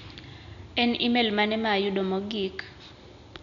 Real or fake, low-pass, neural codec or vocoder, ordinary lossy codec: real; 7.2 kHz; none; none